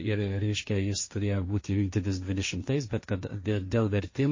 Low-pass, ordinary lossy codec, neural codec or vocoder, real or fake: 7.2 kHz; MP3, 32 kbps; codec, 16 kHz, 1.1 kbps, Voila-Tokenizer; fake